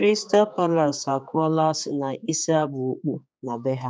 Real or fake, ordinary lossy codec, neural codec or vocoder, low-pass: fake; none; codec, 16 kHz, 4 kbps, X-Codec, HuBERT features, trained on general audio; none